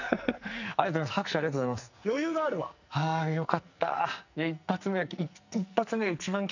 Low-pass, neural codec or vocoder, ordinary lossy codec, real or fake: 7.2 kHz; codec, 44.1 kHz, 2.6 kbps, SNAC; none; fake